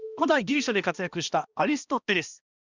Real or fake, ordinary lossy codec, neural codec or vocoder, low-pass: fake; Opus, 64 kbps; codec, 16 kHz, 1 kbps, X-Codec, HuBERT features, trained on balanced general audio; 7.2 kHz